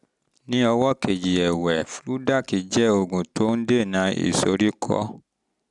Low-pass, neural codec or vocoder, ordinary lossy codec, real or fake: 10.8 kHz; none; none; real